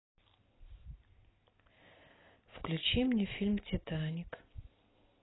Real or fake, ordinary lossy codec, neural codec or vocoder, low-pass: real; AAC, 16 kbps; none; 7.2 kHz